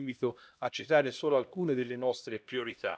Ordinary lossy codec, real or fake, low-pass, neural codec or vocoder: none; fake; none; codec, 16 kHz, 1 kbps, X-Codec, HuBERT features, trained on LibriSpeech